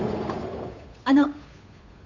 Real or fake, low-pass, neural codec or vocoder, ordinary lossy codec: fake; 7.2 kHz; codec, 16 kHz, 8 kbps, FunCodec, trained on Chinese and English, 25 frames a second; MP3, 48 kbps